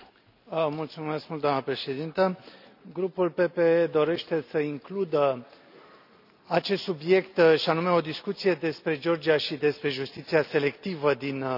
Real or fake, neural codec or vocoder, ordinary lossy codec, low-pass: real; none; none; 5.4 kHz